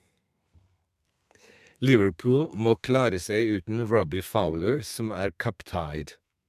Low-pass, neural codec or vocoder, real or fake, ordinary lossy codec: 14.4 kHz; codec, 32 kHz, 1.9 kbps, SNAC; fake; MP3, 96 kbps